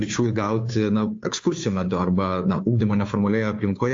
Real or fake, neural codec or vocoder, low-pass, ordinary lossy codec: fake; codec, 16 kHz, 2 kbps, FunCodec, trained on Chinese and English, 25 frames a second; 7.2 kHz; AAC, 48 kbps